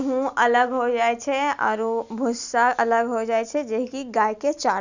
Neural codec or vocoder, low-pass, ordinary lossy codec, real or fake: none; 7.2 kHz; none; real